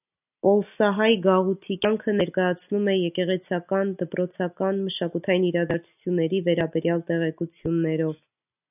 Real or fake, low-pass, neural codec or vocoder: real; 3.6 kHz; none